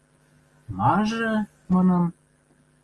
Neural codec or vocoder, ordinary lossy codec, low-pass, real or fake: none; Opus, 24 kbps; 10.8 kHz; real